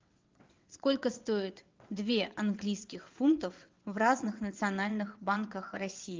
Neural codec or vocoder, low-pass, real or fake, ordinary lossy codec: vocoder, 22.05 kHz, 80 mel bands, WaveNeXt; 7.2 kHz; fake; Opus, 24 kbps